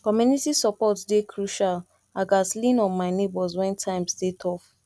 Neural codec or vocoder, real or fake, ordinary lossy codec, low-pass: none; real; none; none